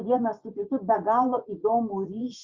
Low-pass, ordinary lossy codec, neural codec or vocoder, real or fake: 7.2 kHz; Opus, 64 kbps; vocoder, 24 kHz, 100 mel bands, Vocos; fake